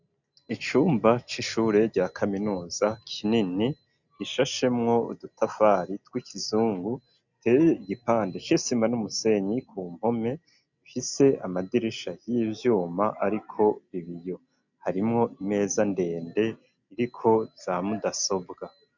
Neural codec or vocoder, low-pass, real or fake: none; 7.2 kHz; real